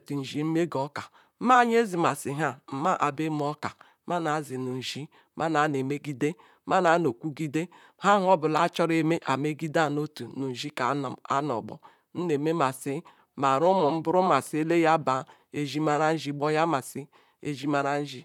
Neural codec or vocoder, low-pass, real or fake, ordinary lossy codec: vocoder, 44.1 kHz, 128 mel bands, Pupu-Vocoder; 19.8 kHz; fake; none